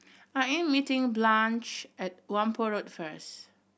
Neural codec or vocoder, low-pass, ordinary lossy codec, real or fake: none; none; none; real